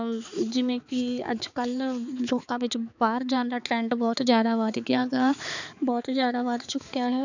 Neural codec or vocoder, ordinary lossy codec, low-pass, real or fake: codec, 16 kHz, 4 kbps, X-Codec, HuBERT features, trained on balanced general audio; none; 7.2 kHz; fake